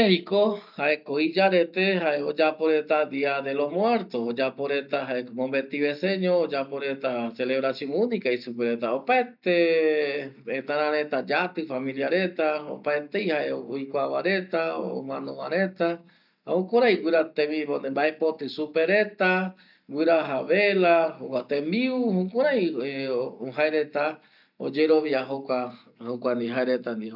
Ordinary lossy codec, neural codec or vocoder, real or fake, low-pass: none; none; real; 5.4 kHz